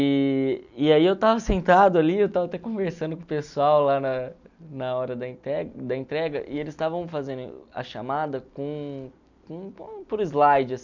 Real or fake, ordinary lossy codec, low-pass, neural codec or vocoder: real; none; 7.2 kHz; none